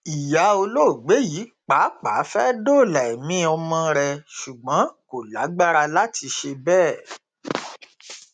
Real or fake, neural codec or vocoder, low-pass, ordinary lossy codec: real; none; 9.9 kHz; none